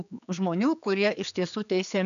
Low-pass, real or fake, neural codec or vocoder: 7.2 kHz; fake; codec, 16 kHz, 4 kbps, X-Codec, HuBERT features, trained on general audio